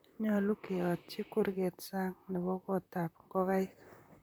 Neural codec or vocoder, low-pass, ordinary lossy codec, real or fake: vocoder, 44.1 kHz, 128 mel bands, Pupu-Vocoder; none; none; fake